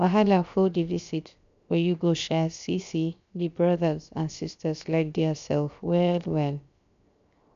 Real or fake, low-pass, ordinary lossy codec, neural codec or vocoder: fake; 7.2 kHz; MP3, 64 kbps; codec, 16 kHz, 0.7 kbps, FocalCodec